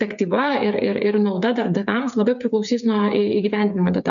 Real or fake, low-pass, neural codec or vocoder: fake; 7.2 kHz; codec, 16 kHz, 8 kbps, FreqCodec, smaller model